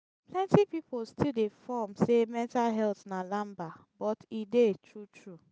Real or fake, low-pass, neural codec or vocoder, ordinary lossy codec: real; none; none; none